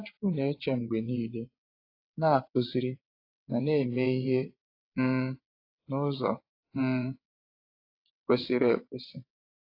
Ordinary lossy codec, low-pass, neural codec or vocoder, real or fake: AAC, 32 kbps; 5.4 kHz; vocoder, 22.05 kHz, 80 mel bands, WaveNeXt; fake